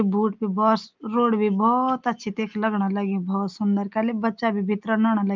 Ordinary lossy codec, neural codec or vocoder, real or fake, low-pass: Opus, 24 kbps; none; real; 7.2 kHz